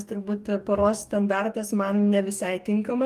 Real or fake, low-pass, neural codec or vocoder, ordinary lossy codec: fake; 14.4 kHz; codec, 44.1 kHz, 2.6 kbps, DAC; Opus, 32 kbps